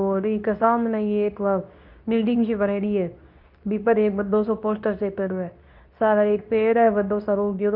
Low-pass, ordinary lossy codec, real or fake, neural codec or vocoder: 5.4 kHz; none; fake; codec, 24 kHz, 0.9 kbps, WavTokenizer, medium speech release version 2